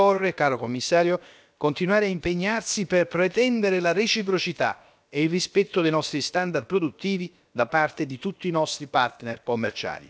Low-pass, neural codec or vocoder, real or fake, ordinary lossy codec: none; codec, 16 kHz, about 1 kbps, DyCAST, with the encoder's durations; fake; none